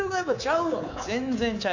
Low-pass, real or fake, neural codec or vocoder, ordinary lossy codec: 7.2 kHz; fake; codec, 24 kHz, 3.1 kbps, DualCodec; none